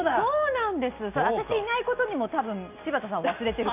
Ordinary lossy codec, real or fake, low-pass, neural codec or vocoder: none; real; 3.6 kHz; none